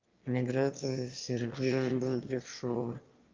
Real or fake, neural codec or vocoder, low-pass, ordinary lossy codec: fake; autoencoder, 22.05 kHz, a latent of 192 numbers a frame, VITS, trained on one speaker; 7.2 kHz; Opus, 24 kbps